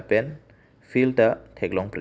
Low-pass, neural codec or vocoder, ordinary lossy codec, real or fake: none; none; none; real